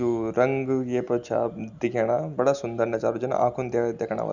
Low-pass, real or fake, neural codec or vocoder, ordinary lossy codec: 7.2 kHz; real; none; none